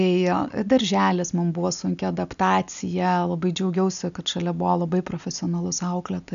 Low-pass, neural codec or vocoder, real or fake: 7.2 kHz; none; real